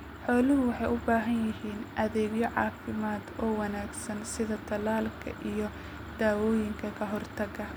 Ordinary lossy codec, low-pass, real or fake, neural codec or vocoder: none; none; real; none